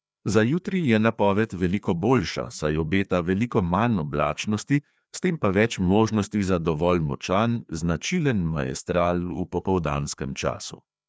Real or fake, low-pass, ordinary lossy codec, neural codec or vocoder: fake; none; none; codec, 16 kHz, 2 kbps, FreqCodec, larger model